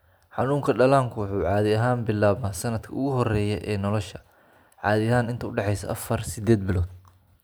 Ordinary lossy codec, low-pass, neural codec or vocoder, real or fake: none; none; none; real